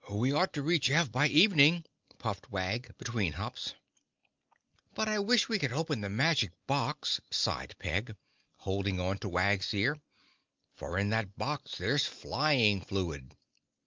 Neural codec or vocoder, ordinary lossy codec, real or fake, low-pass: none; Opus, 24 kbps; real; 7.2 kHz